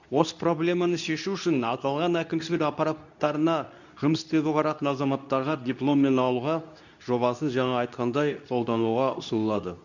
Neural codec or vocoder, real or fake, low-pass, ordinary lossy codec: codec, 24 kHz, 0.9 kbps, WavTokenizer, medium speech release version 2; fake; 7.2 kHz; none